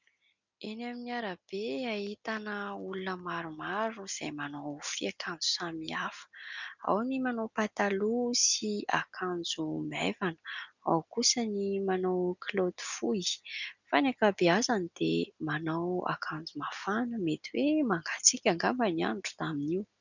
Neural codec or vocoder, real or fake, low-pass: none; real; 7.2 kHz